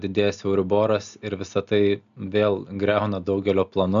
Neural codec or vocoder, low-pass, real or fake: none; 7.2 kHz; real